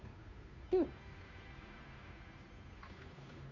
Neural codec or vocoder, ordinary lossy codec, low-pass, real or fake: codec, 16 kHz, 2 kbps, FunCodec, trained on Chinese and English, 25 frames a second; MP3, 64 kbps; 7.2 kHz; fake